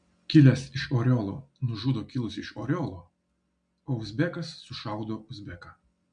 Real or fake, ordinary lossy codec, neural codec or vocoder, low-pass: real; MP3, 64 kbps; none; 9.9 kHz